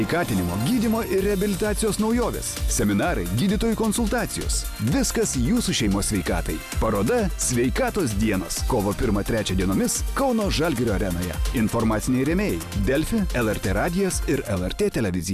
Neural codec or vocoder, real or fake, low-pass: none; real; 14.4 kHz